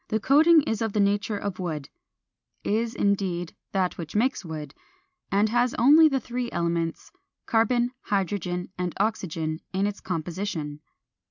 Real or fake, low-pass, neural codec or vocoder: real; 7.2 kHz; none